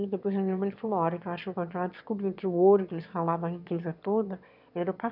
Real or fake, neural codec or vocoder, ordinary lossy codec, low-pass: fake; autoencoder, 22.05 kHz, a latent of 192 numbers a frame, VITS, trained on one speaker; none; 5.4 kHz